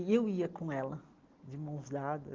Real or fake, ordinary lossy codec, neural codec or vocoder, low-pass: real; Opus, 16 kbps; none; 7.2 kHz